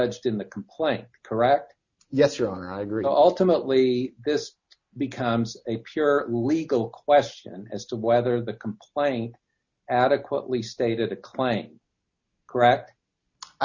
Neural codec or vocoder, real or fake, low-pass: none; real; 7.2 kHz